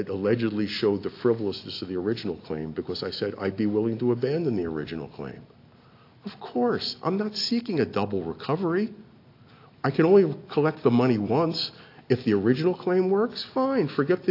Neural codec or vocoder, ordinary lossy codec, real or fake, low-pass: none; AAC, 32 kbps; real; 5.4 kHz